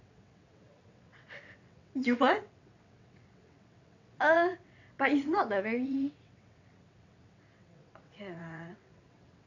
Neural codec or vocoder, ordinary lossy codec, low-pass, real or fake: vocoder, 22.05 kHz, 80 mel bands, WaveNeXt; none; 7.2 kHz; fake